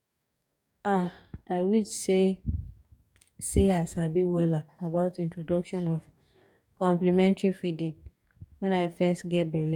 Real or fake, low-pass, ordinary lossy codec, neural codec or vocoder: fake; 19.8 kHz; none; codec, 44.1 kHz, 2.6 kbps, DAC